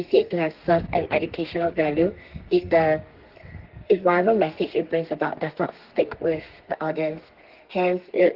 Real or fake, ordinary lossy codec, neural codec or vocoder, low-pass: fake; Opus, 16 kbps; codec, 32 kHz, 1.9 kbps, SNAC; 5.4 kHz